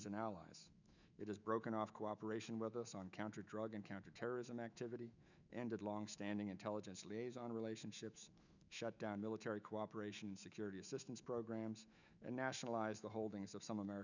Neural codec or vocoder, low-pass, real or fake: autoencoder, 48 kHz, 128 numbers a frame, DAC-VAE, trained on Japanese speech; 7.2 kHz; fake